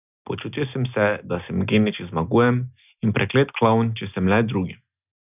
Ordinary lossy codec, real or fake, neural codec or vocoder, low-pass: none; real; none; 3.6 kHz